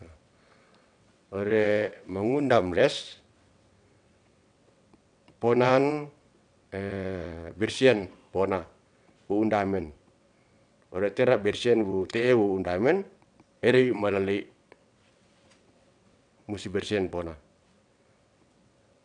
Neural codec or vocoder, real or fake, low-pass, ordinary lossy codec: vocoder, 22.05 kHz, 80 mel bands, WaveNeXt; fake; 9.9 kHz; none